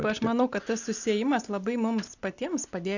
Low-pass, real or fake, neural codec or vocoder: 7.2 kHz; real; none